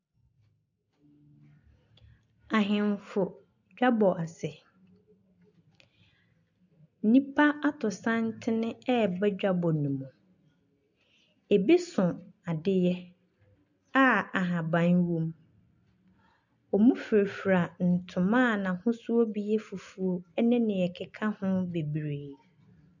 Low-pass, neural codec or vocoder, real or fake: 7.2 kHz; none; real